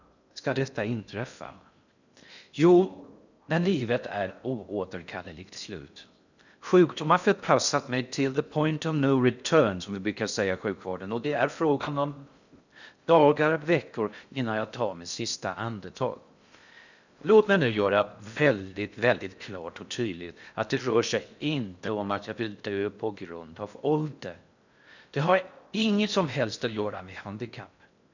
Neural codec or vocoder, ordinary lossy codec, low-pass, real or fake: codec, 16 kHz in and 24 kHz out, 0.6 kbps, FocalCodec, streaming, 2048 codes; none; 7.2 kHz; fake